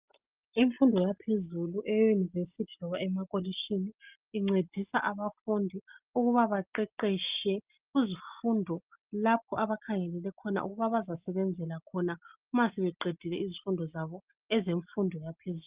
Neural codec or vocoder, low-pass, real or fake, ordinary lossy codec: none; 3.6 kHz; real; Opus, 24 kbps